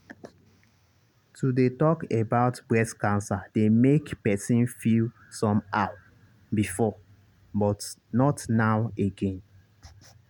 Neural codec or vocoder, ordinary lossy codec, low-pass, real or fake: none; none; 19.8 kHz; real